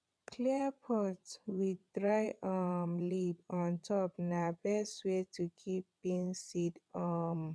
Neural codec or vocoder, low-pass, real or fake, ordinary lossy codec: vocoder, 22.05 kHz, 80 mel bands, WaveNeXt; none; fake; none